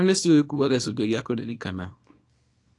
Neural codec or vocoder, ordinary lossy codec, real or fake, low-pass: codec, 24 kHz, 0.9 kbps, WavTokenizer, small release; AAC, 48 kbps; fake; 10.8 kHz